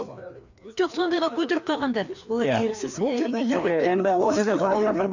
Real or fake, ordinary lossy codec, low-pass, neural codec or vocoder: fake; none; 7.2 kHz; codec, 16 kHz, 2 kbps, FreqCodec, larger model